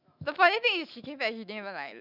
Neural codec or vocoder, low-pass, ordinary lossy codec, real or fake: autoencoder, 48 kHz, 128 numbers a frame, DAC-VAE, trained on Japanese speech; 5.4 kHz; none; fake